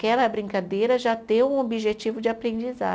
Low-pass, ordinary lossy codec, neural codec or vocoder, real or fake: none; none; none; real